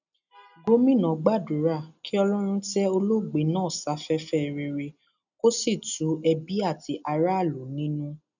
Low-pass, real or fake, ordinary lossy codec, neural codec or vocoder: 7.2 kHz; real; none; none